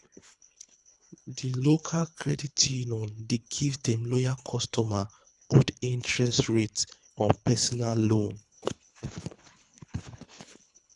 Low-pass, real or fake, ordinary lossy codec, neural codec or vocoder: 10.8 kHz; fake; none; codec, 24 kHz, 3 kbps, HILCodec